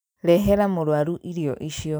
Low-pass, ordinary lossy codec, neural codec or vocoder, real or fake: none; none; none; real